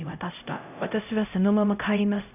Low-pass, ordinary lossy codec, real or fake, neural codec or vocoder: 3.6 kHz; none; fake; codec, 16 kHz, 0.5 kbps, X-Codec, HuBERT features, trained on LibriSpeech